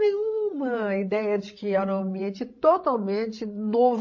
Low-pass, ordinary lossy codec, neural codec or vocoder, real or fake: 7.2 kHz; MP3, 32 kbps; codec, 16 kHz, 16 kbps, FreqCodec, larger model; fake